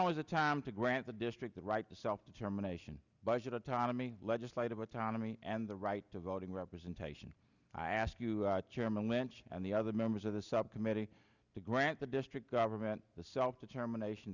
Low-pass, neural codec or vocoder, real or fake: 7.2 kHz; none; real